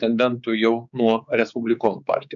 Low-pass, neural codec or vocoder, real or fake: 7.2 kHz; codec, 16 kHz, 4 kbps, X-Codec, HuBERT features, trained on general audio; fake